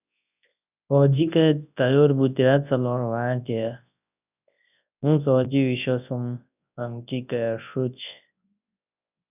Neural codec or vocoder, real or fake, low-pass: codec, 24 kHz, 0.9 kbps, WavTokenizer, large speech release; fake; 3.6 kHz